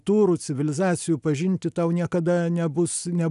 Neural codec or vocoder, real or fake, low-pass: none; real; 10.8 kHz